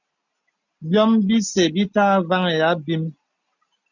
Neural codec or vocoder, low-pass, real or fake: none; 7.2 kHz; real